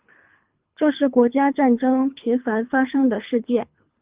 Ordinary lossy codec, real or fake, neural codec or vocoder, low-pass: Opus, 32 kbps; fake; codec, 24 kHz, 3 kbps, HILCodec; 3.6 kHz